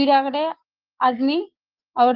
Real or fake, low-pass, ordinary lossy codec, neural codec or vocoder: fake; 5.4 kHz; Opus, 16 kbps; codec, 44.1 kHz, 7.8 kbps, Pupu-Codec